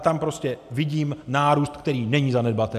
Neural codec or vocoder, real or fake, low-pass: none; real; 14.4 kHz